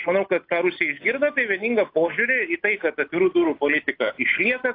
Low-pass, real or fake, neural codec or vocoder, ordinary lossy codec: 5.4 kHz; real; none; AAC, 32 kbps